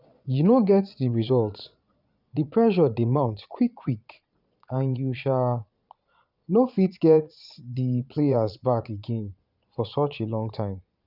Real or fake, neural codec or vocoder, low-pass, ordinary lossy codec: fake; vocoder, 22.05 kHz, 80 mel bands, Vocos; 5.4 kHz; none